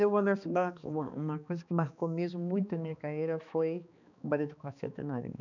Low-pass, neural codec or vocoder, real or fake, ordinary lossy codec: 7.2 kHz; codec, 16 kHz, 2 kbps, X-Codec, HuBERT features, trained on balanced general audio; fake; none